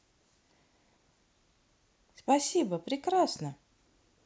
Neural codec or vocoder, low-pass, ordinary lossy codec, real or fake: none; none; none; real